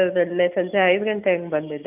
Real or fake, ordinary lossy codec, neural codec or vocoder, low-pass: real; none; none; 3.6 kHz